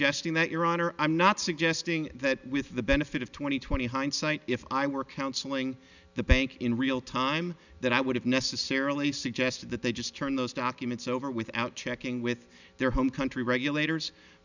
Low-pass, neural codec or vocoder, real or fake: 7.2 kHz; none; real